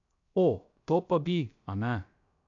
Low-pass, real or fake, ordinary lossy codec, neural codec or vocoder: 7.2 kHz; fake; AAC, 64 kbps; codec, 16 kHz, 0.3 kbps, FocalCodec